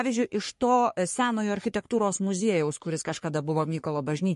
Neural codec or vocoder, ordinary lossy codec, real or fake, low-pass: codec, 44.1 kHz, 3.4 kbps, Pupu-Codec; MP3, 48 kbps; fake; 14.4 kHz